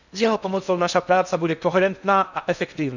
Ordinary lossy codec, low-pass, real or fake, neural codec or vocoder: none; 7.2 kHz; fake; codec, 16 kHz in and 24 kHz out, 0.6 kbps, FocalCodec, streaming, 2048 codes